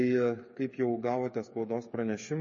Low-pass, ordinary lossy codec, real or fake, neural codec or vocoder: 7.2 kHz; MP3, 32 kbps; fake; codec, 16 kHz, 8 kbps, FreqCodec, smaller model